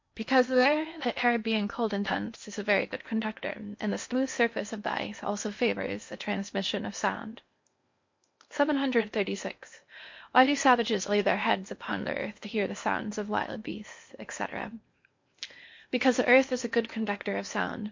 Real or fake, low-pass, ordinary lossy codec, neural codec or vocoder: fake; 7.2 kHz; MP3, 48 kbps; codec, 16 kHz in and 24 kHz out, 0.8 kbps, FocalCodec, streaming, 65536 codes